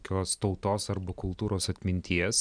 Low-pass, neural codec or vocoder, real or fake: 9.9 kHz; none; real